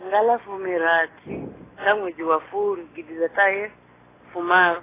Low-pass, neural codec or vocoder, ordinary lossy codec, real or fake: 3.6 kHz; none; AAC, 16 kbps; real